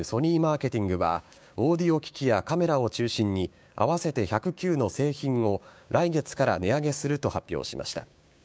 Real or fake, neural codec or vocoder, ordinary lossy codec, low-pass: fake; codec, 16 kHz, 6 kbps, DAC; none; none